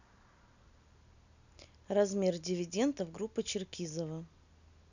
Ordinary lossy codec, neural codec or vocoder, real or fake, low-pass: none; none; real; 7.2 kHz